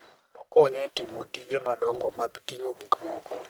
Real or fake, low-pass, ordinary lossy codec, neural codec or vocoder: fake; none; none; codec, 44.1 kHz, 1.7 kbps, Pupu-Codec